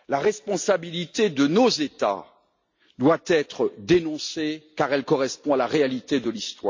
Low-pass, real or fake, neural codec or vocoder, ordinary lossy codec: 7.2 kHz; real; none; MP3, 48 kbps